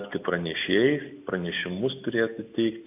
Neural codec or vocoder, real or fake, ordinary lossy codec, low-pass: none; real; AAC, 32 kbps; 3.6 kHz